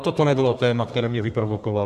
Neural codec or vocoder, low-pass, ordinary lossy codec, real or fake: codec, 32 kHz, 1.9 kbps, SNAC; 14.4 kHz; MP3, 64 kbps; fake